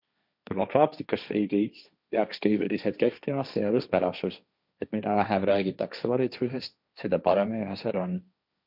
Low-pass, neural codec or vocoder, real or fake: 5.4 kHz; codec, 16 kHz, 1.1 kbps, Voila-Tokenizer; fake